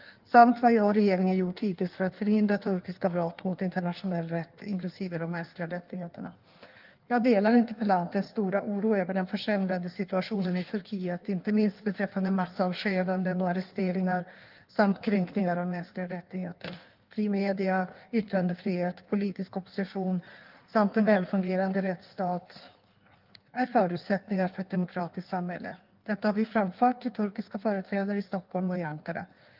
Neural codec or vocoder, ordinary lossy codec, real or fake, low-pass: codec, 16 kHz in and 24 kHz out, 1.1 kbps, FireRedTTS-2 codec; Opus, 32 kbps; fake; 5.4 kHz